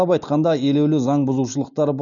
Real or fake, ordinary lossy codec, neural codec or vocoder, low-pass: real; none; none; 7.2 kHz